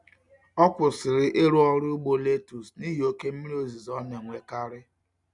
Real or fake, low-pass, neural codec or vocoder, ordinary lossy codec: real; 10.8 kHz; none; none